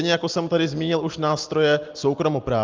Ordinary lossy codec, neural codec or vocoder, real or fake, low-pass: Opus, 24 kbps; none; real; 7.2 kHz